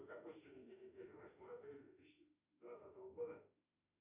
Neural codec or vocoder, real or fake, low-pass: autoencoder, 48 kHz, 32 numbers a frame, DAC-VAE, trained on Japanese speech; fake; 3.6 kHz